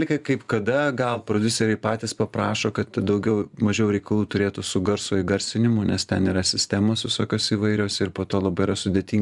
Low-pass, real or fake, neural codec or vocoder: 10.8 kHz; fake; vocoder, 24 kHz, 100 mel bands, Vocos